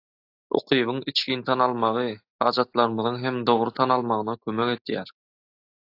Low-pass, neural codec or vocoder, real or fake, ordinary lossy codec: 5.4 kHz; none; real; AAC, 32 kbps